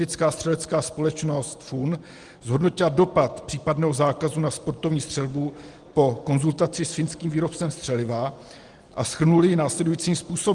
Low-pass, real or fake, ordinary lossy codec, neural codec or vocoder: 10.8 kHz; real; Opus, 24 kbps; none